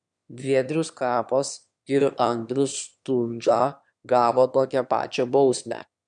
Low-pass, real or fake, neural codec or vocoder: 9.9 kHz; fake; autoencoder, 22.05 kHz, a latent of 192 numbers a frame, VITS, trained on one speaker